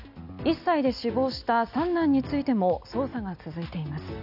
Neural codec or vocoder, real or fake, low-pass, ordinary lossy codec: none; real; 5.4 kHz; none